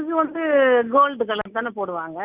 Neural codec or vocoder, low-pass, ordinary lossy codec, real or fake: none; 3.6 kHz; Opus, 64 kbps; real